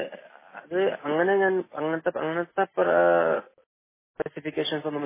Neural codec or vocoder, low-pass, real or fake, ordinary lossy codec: none; 3.6 kHz; real; MP3, 16 kbps